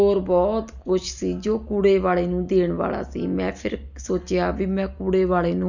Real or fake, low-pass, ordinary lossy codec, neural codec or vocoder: real; 7.2 kHz; none; none